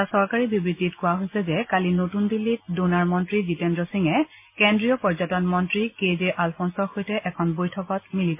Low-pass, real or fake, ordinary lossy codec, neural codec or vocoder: 3.6 kHz; real; MP3, 24 kbps; none